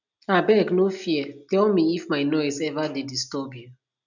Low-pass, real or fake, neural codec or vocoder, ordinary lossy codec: 7.2 kHz; real; none; none